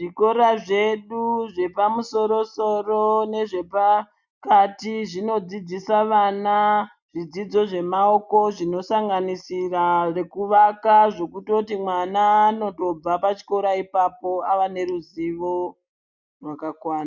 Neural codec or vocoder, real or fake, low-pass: none; real; 7.2 kHz